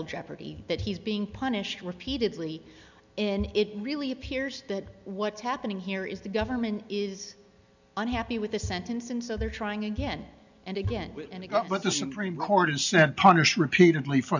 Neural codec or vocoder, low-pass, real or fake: none; 7.2 kHz; real